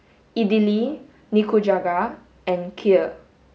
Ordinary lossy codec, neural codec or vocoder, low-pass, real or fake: none; none; none; real